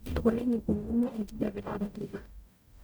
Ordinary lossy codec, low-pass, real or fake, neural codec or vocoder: none; none; fake; codec, 44.1 kHz, 0.9 kbps, DAC